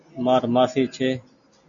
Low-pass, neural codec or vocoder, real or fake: 7.2 kHz; none; real